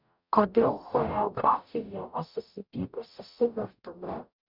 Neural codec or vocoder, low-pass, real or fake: codec, 44.1 kHz, 0.9 kbps, DAC; 5.4 kHz; fake